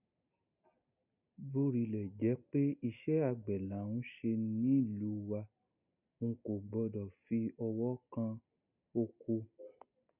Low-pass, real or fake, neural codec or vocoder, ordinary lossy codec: 3.6 kHz; real; none; none